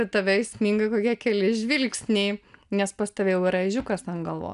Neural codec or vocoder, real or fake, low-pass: none; real; 10.8 kHz